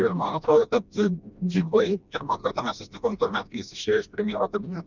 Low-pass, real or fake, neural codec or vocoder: 7.2 kHz; fake; codec, 16 kHz, 1 kbps, FreqCodec, smaller model